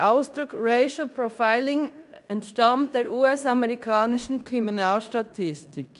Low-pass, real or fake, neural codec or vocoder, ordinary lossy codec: 10.8 kHz; fake; codec, 16 kHz in and 24 kHz out, 0.9 kbps, LongCat-Audio-Codec, fine tuned four codebook decoder; none